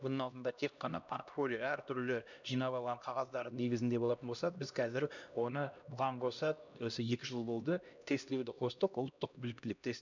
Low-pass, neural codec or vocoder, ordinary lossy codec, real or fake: 7.2 kHz; codec, 16 kHz, 1 kbps, X-Codec, HuBERT features, trained on LibriSpeech; none; fake